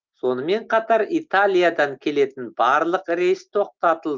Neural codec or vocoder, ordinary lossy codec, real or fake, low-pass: none; Opus, 24 kbps; real; 7.2 kHz